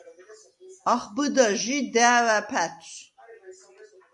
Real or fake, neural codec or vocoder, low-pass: real; none; 10.8 kHz